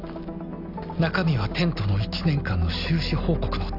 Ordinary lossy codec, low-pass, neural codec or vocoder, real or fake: none; 5.4 kHz; none; real